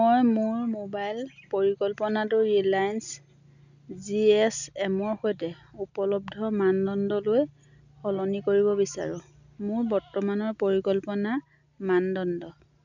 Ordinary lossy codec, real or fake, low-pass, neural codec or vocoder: none; real; 7.2 kHz; none